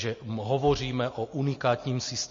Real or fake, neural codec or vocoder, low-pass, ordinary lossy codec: real; none; 7.2 kHz; MP3, 32 kbps